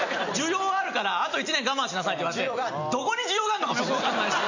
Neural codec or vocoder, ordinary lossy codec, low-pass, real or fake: none; none; 7.2 kHz; real